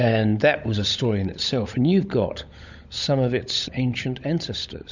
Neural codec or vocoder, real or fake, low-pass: codec, 16 kHz, 16 kbps, FunCodec, trained on LibriTTS, 50 frames a second; fake; 7.2 kHz